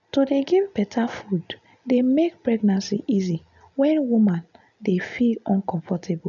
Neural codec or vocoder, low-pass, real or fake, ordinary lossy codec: none; 7.2 kHz; real; none